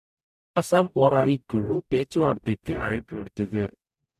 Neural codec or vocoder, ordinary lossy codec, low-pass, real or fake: codec, 44.1 kHz, 0.9 kbps, DAC; none; 14.4 kHz; fake